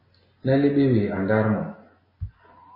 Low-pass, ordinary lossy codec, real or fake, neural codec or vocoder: 5.4 kHz; MP3, 24 kbps; real; none